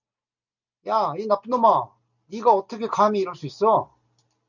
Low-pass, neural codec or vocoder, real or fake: 7.2 kHz; none; real